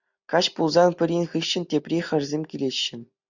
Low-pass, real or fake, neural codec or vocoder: 7.2 kHz; real; none